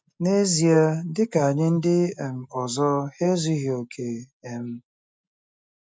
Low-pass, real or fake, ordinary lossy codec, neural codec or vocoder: none; real; none; none